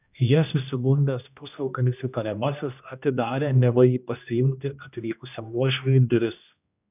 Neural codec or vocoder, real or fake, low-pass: codec, 16 kHz, 1 kbps, X-Codec, HuBERT features, trained on general audio; fake; 3.6 kHz